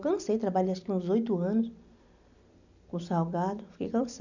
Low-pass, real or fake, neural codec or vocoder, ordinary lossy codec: 7.2 kHz; real; none; none